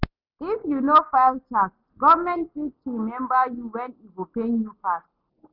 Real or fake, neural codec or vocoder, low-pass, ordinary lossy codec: real; none; 5.4 kHz; none